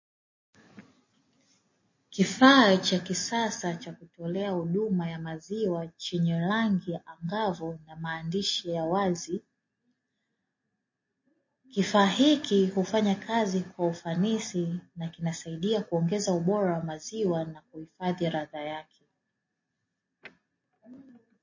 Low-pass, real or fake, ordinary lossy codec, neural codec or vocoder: 7.2 kHz; real; MP3, 32 kbps; none